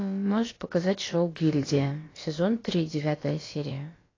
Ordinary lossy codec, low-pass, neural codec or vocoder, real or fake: AAC, 32 kbps; 7.2 kHz; codec, 16 kHz, about 1 kbps, DyCAST, with the encoder's durations; fake